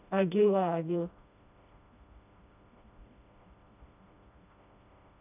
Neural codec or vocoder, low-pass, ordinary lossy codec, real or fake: codec, 16 kHz, 1 kbps, FreqCodec, smaller model; 3.6 kHz; none; fake